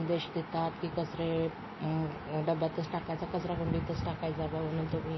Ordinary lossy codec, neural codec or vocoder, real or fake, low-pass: MP3, 24 kbps; none; real; 7.2 kHz